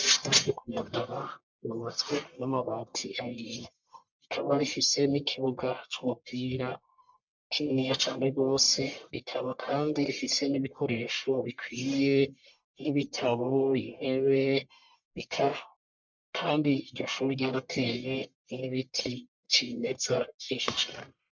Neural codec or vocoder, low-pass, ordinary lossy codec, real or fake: codec, 44.1 kHz, 1.7 kbps, Pupu-Codec; 7.2 kHz; MP3, 64 kbps; fake